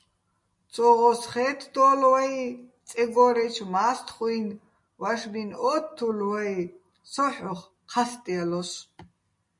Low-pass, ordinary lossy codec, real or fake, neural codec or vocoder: 10.8 kHz; MP3, 48 kbps; real; none